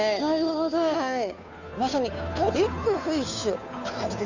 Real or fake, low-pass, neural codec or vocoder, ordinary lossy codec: fake; 7.2 kHz; codec, 16 kHz, 2 kbps, FunCodec, trained on Chinese and English, 25 frames a second; none